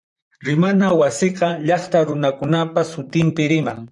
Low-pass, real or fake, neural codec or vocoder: 10.8 kHz; fake; vocoder, 44.1 kHz, 128 mel bands, Pupu-Vocoder